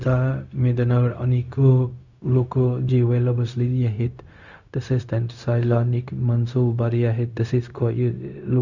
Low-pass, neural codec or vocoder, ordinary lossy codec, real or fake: 7.2 kHz; codec, 16 kHz, 0.4 kbps, LongCat-Audio-Codec; Opus, 64 kbps; fake